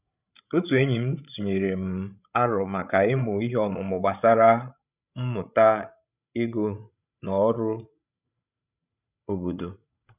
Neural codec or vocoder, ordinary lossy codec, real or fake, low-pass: codec, 16 kHz, 16 kbps, FreqCodec, larger model; none; fake; 3.6 kHz